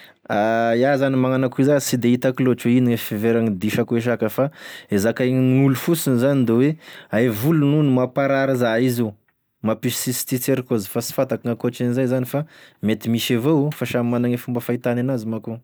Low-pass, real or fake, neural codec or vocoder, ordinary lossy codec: none; real; none; none